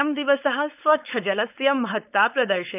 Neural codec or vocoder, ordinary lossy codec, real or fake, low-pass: codec, 16 kHz, 4.8 kbps, FACodec; none; fake; 3.6 kHz